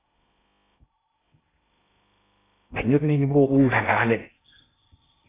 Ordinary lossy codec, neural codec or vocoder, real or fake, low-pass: AAC, 16 kbps; codec, 16 kHz in and 24 kHz out, 0.6 kbps, FocalCodec, streaming, 2048 codes; fake; 3.6 kHz